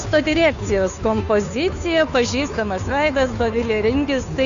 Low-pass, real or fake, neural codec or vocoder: 7.2 kHz; fake; codec, 16 kHz, 2 kbps, FunCodec, trained on Chinese and English, 25 frames a second